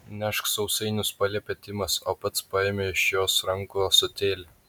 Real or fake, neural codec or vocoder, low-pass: real; none; 19.8 kHz